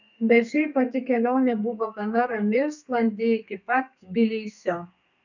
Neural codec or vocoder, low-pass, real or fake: codec, 32 kHz, 1.9 kbps, SNAC; 7.2 kHz; fake